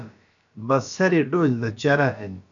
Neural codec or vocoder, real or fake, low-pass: codec, 16 kHz, about 1 kbps, DyCAST, with the encoder's durations; fake; 7.2 kHz